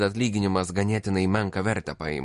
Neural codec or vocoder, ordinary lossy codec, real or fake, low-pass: none; MP3, 48 kbps; real; 14.4 kHz